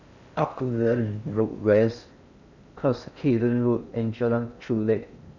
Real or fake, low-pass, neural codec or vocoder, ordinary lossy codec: fake; 7.2 kHz; codec, 16 kHz in and 24 kHz out, 0.6 kbps, FocalCodec, streaming, 4096 codes; none